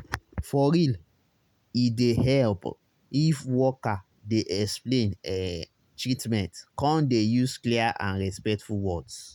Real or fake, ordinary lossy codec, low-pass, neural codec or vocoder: real; none; 19.8 kHz; none